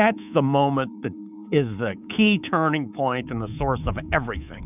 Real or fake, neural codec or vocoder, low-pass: fake; codec, 24 kHz, 3.1 kbps, DualCodec; 3.6 kHz